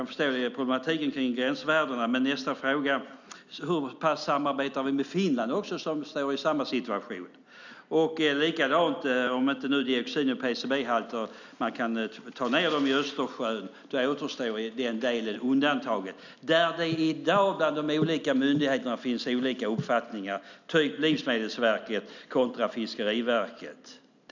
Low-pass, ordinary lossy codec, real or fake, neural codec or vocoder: 7.2 kHz; none; real; none